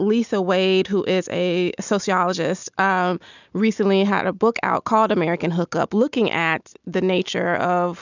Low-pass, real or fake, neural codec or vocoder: 7.2 kHz; real; none